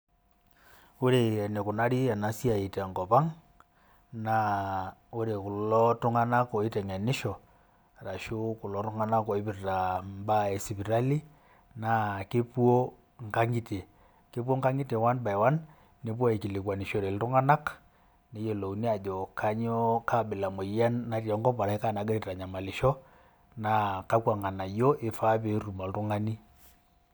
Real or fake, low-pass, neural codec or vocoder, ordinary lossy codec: real; none; none; none